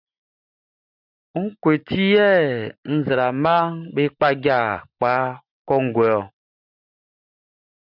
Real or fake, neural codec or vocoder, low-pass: real; none; 5.4 kHz